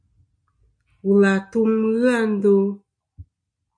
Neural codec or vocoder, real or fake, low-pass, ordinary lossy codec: none; real; 9.9 kHz; MP3, 48 kbps